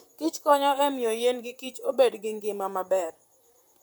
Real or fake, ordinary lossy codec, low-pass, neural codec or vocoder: fake; none; none; vocoder, 44.1 kHz, 128 mel bands, Pupu-Vocoder